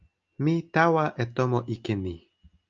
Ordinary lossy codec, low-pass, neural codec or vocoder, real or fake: Opus, 24 kbps; 7.2 kHz; none; real